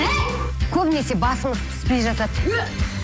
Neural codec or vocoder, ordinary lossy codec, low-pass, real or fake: none; none; none; real